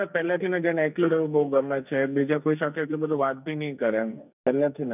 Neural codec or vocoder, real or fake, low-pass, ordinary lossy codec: codec, 44.1 kHz, 2.6 kbps, SNAC; fake; 3.6 kHz; none